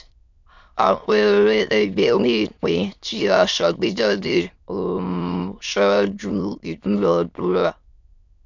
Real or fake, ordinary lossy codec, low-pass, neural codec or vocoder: fake; none; 7.2 kHz; autoencoder, 22.05 kHz, a latent of 192 numbers a frame, VITS, trained on many speakers